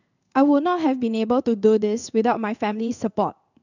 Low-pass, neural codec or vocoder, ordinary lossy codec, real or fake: 7.2 kHz; codec, 16 kHz in and 24 kHz out, 1 kbps, XY-Tokenizer; none; fake